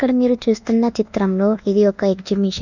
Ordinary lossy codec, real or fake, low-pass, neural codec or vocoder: none; fake; 7.2 kHz; codec, 24 kHz, 1.2 kbps, DualCodec